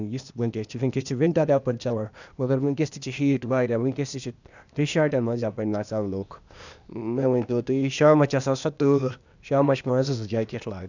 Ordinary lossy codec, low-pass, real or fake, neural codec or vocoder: none; 7.2 kHz; fake; codec, 16 kHz, 0.8 kbps, ZipCodec